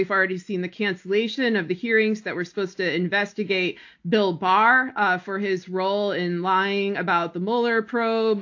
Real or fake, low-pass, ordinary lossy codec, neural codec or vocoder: real; 7.2 kHz; AAC, 48 kbps; none